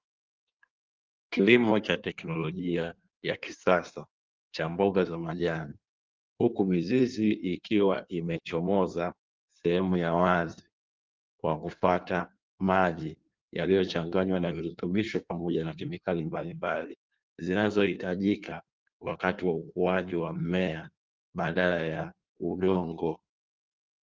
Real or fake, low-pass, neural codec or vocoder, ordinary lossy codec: fake; 7.2 kHz; codec, 16 kHz in and 24 kHz out, 1.1 kbps, FireRedTTS-2 codec; Opus, 24 kbps